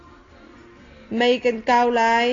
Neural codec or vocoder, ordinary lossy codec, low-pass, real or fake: none; AAC, 32 kbps; 7.2 kHz; real